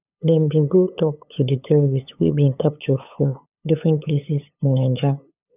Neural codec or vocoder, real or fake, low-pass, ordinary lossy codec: codec, 16 kHz, 8 kbps, FunCodec, trained on LibriTTS, 25 frames a second; fake; 3.6 kHz; none